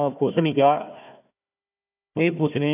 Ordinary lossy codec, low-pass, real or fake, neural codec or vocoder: none; 3.6 kHz; fake; codec, 16 kHz, 1 kbps, FunCodec, trained on Chinese and English, 50 frames a second